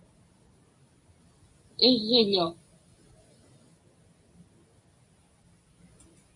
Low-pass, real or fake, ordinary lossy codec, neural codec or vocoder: 10.8 kHz; real; MP3, 64 kbps; none